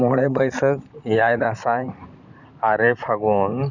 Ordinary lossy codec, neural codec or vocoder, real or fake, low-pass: none; vocoder, 44.1 kHz, 128 mel bands, Pupu-Vocoder; fake; 7.2 kHz